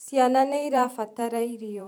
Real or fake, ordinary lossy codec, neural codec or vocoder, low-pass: fake; MP3, 96 kbps; vocoder, 44.1 kHz, 128 mel bands every 512 samples, BigVGAN v2; 19.8 kHz